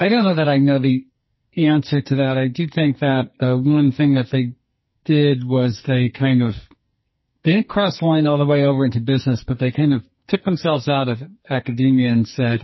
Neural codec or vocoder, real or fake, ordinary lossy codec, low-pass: codec, 44.1 kHz, 2.6 kbps, SNAC; fake; MP3, 24 kbps; 7.2 kHz